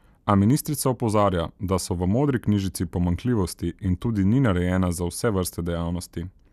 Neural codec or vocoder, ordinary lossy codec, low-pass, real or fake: none; none; 14.4 kHz; real